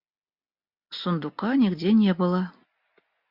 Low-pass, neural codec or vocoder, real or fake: 5.4 kHz; none; real